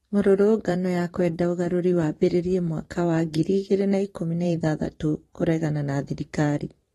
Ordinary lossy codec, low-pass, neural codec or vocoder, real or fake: AAC, 32 kbps; 19.8 kHz; vocoder, 44.1 kHz, 128 mel bands every 512 samples, BigVGAN v2; fake